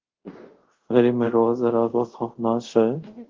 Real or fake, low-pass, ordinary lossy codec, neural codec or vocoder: fake; 7.2 kHz; Opus, 16 kbps; codec, 24 kHz, 0.5 kbps, DualCodec